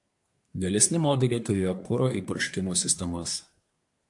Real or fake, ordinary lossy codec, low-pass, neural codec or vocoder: fake; AAC, 64 kbps; 10.8 kHz; codec, 24 kHz, 1 kbps, SNAC